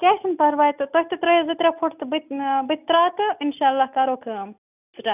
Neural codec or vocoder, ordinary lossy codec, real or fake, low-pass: none; none; real; 3.6 kHz